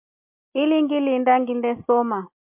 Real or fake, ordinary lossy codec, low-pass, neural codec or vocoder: real; MP3, 32 kbps; 3.6 kHz; none